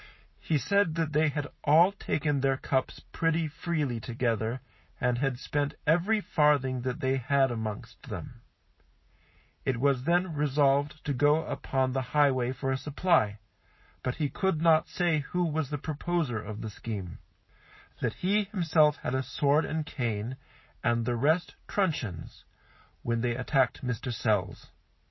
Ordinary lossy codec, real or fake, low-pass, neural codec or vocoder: MP3, 24 kbps; real; 7.2 kHz; none